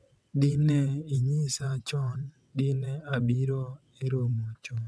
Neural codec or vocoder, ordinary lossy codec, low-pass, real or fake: vocoder, 22.05 kHz, 80 mel bands, WaveNeXt; none; none; fake